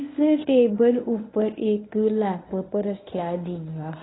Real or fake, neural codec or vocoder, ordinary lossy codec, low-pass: fake; codec, 24 kHz, 0.9 kbps, WavTokenizer, medium speech release version 1; AAC, 16 kbps; 7.2 kHz